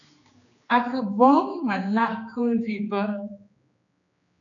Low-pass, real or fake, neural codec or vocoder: 7.2 kHz; fake; codec, 16 kHz, 2 kbps, X-Codec, HuBERT features, trained on general audio